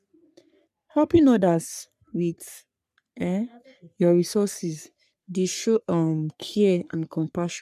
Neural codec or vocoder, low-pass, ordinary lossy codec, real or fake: codec, 44.1 kHz, 3.4 kbps, Pupu-Codec; 14.4 kHz; none; fake